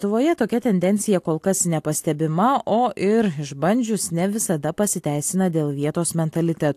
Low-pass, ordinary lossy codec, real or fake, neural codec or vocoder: 14.4 kHz; AAC, 64 kbps; real; none